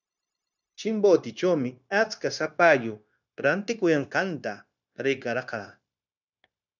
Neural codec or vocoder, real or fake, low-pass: codec, 16 kHz, 0.9 kbps, LongCat-Audio-Codec; fake; 7.2 kHz